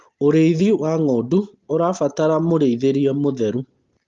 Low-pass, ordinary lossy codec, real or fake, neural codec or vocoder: 7.2 kHz; Opus, 32 kbps; real; none